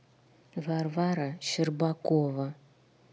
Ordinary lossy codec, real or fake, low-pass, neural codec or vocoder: none; real; none; none